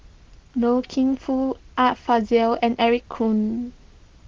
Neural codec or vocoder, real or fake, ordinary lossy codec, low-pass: none; real; Opus, 16 kbps; 7.2 kHz